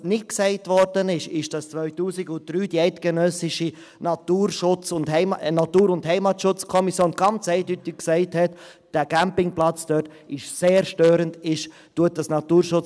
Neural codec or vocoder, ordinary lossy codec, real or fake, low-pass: none; none; real; none